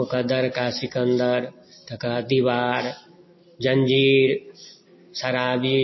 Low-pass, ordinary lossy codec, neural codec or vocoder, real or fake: 7.2 kHz; MP3, 24 kbps; none; real